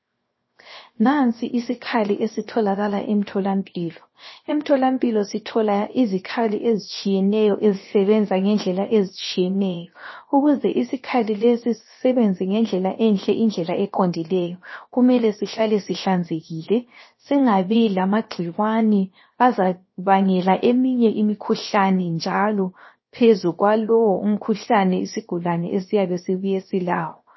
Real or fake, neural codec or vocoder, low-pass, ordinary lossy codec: fake; codec, 16 kHz, 0.7 kbps, FocalCodec; 7.2 kHz; MP3, 24 kbps